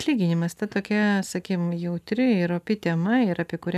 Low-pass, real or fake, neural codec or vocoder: 14.4 kHz; real; none